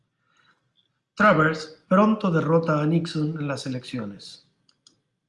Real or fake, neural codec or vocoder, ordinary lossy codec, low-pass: real; none; Opus, 32 kbps; 10.8 kHz